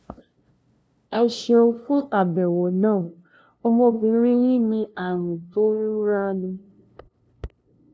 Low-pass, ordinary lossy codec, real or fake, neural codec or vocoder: none; none; fake; codec, 16 kHz, 0.5 kbps, FunCodec, trained on LibriTTS, 25 frames a second